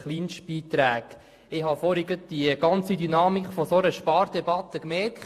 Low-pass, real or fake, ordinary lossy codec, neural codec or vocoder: 14.4 kHz; fake; AAC, 64 kbps; vocoder, 48 kHz, 128 mel bands, Vocos